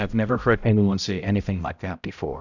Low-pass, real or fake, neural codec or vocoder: 7.2 kHz; fake; codec, 16 kHz, 0.5 kbps, X-Codec, HuBERT features, trained on balanced general audio